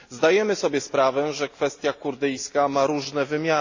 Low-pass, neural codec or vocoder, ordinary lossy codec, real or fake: 7.2 kHz; none; AAC, 48 kbps; real